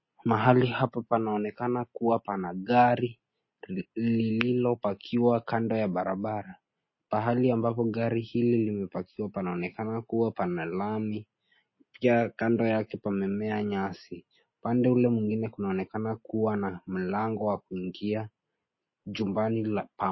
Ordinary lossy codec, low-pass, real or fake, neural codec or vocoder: MP3, 24 kbps; 7.2 kHz; real; none